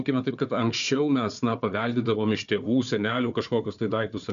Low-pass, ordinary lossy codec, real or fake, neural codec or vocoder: 7.2 kHz; MP3, 96 kbps; fake; codec, 16 kHz, 4 kbps, FunCodec, trained on Chinese and English, 50 frames a second